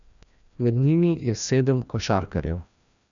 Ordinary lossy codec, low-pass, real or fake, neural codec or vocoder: none; 7.2 kHz; fake; codec, 16 kHz, 1 kbps, FreqCodec, larger model